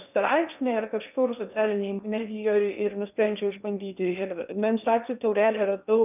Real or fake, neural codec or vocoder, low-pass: fake; codec, 16 kHz in and 24 kHz out, 0.8 kbps, FocalCodec, streaming, 65536 codes; 3.6 kHz